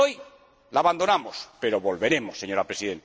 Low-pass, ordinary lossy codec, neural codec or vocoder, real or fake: none; none; none; real